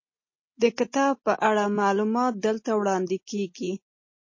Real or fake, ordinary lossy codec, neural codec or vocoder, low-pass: real; MP3, 32 kbps; none; 7.2 kHz